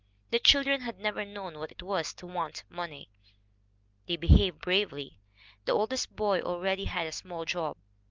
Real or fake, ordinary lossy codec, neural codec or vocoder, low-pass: real; Opus, 32 kbps; none; 7.2 kHz